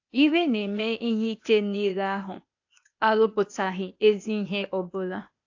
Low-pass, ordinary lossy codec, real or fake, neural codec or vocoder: 7.2 kHz; AAC, 48 kbps; fake; codec, 16 kHz, 0.8 kbps, ZipCodec